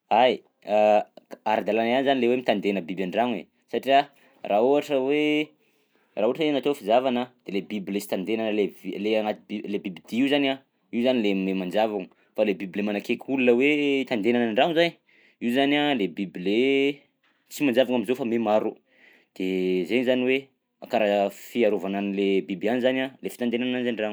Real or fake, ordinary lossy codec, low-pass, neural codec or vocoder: real; none; none; none